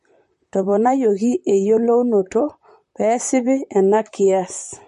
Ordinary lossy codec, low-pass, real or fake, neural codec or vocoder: MP3, 48 kbps; 14.4 kHz; fake; vocoder, 44.1 kHz, 128 mel bands, Pupu-Vocoder